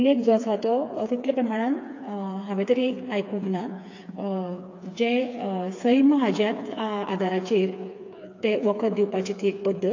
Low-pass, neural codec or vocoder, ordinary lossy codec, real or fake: 7.2 kHz; codec, 16 kHz, 4 kbps, FreqCodec, smaller model; AAC, 48 kbps; fake